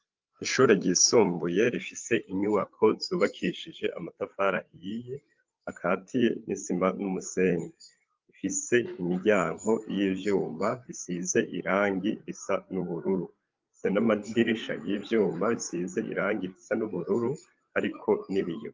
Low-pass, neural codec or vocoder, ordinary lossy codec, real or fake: 7.2 kHz; codec, 16 kHz, 8 kbps, FreqCodec, larger model; Opus, 32 kbps; fake